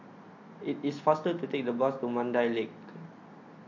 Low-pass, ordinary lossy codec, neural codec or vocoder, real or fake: 7.2 kHz; MP3, 48 kbps; none; real